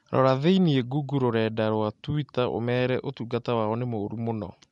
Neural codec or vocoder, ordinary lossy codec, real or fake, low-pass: none; MP3, 64 kbps; real; 10.8 kHz